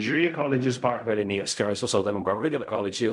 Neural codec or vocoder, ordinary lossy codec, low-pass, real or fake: codec, 16 kHz in and 24 kHz out, 0.4 kbps, LongCat-Audio-Codec, fine tuned four codebook decoder; MP3, 96 kbps; 10.8 kHz; fake